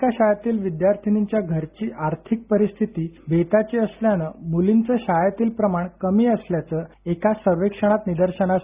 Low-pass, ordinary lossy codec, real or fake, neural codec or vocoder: 3.6 kHz; Opus, 64 kbps; real; none